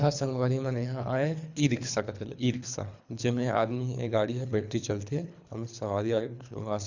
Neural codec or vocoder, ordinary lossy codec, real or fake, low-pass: codec, 24 kHz, 3 kbps, HILCodec; none; fake; 7.2 kHz